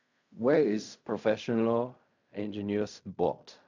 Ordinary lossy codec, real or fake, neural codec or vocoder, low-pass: MP3, 64 kbps; fake; codec, 16 kHz in and 24 kHz out, 0.4 kbps, LongCat-Audio-Codec, fine tuned four codebook decoder; 7.2 kHz